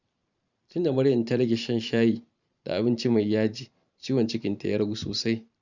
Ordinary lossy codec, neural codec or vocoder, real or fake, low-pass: none; vocoder, 44.1 kHz, 128 mel bands every 512 samples, BigVGAN v2; fake; 7.2 kHz